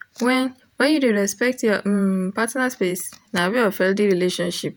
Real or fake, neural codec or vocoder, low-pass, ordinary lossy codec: fake; vocoder, 48 kHz, 128 mel bands, Vocos; none; none